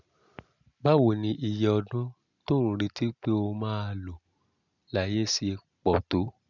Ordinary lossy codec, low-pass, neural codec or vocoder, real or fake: none; 7.2 kHz; none; real